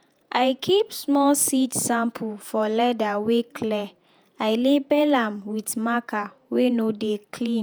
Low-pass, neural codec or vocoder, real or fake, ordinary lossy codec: none; vocoder, 48 kHz, 128 mel bands, Vocos; fake; none